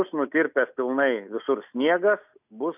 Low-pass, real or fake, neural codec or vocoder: 3.6 kHz; real; none